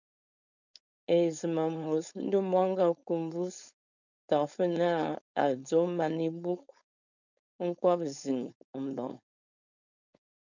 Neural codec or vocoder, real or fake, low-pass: codec, 16 kHz, 4.8 kbps, FACodec; fake; 7.2 kHz